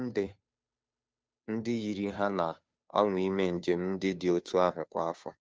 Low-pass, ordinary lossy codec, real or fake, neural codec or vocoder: none; none; fake; codec, 16 kHz, 2 kbps, FunCodec, trained on Chinese and English, 25 frames a second